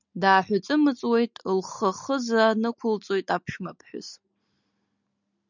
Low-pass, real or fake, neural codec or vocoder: 7.2 kHz; real; none